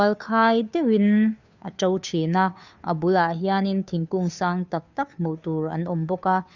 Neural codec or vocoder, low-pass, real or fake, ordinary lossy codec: codec, 16 kHz, 2 kbps, FunCodec, trained on Chinese and English, 25 frames a second; 7.2 kHz; fake; none